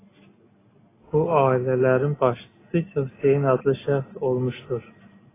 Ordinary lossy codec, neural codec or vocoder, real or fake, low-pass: AAC, 16 kbps; none; real; 3.6 kHz